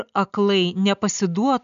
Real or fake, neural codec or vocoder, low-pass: real; none; 7.2 kHz